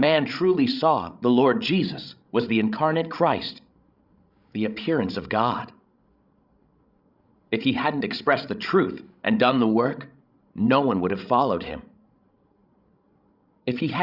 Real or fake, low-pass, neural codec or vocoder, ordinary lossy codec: fake; 5.4 kHz; codec, 16 kHz, 16 kbps, FreqCodec, larger model; Opus, 64 kbps